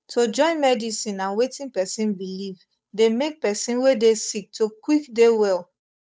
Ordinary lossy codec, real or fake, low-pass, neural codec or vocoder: none; fake; none; codec, 16 kHz, 8 kbps, FunCodec, trained on Chinese and English, 25 frames a second